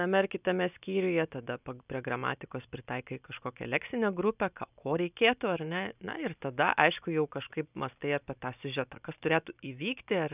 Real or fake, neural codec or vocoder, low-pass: real; none; 3.6 kHz